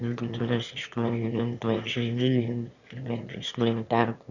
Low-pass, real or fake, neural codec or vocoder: 7.2 kHz; fake; autoencoder, 22.05 kHz, a latent of 192 numbers a frame, VITS, trained on one speaker